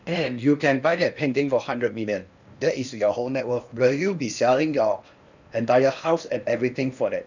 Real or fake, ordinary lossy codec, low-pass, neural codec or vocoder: fake; none; 7.2 kHz; codec, 16 kHz in and 24 kHz out, 0.8 kbps, FocalCodec, streaming, 65536 codes